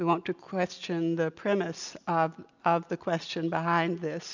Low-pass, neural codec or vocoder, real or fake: 7.2 kHz; none; real